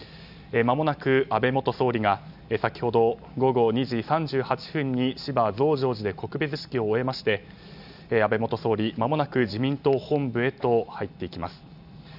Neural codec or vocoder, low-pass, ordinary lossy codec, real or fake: none; 5.4 kHz; none; real